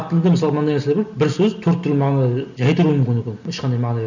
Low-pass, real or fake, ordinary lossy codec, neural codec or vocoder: 7.2 kHz; real; none; none